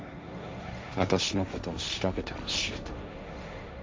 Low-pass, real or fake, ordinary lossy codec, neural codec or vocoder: none; fake; none; codec, 16 kHz, 1.1 kbps, Voila-Tokenizer